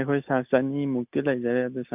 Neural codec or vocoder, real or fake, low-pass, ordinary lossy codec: none; real; 3.6 kHz; none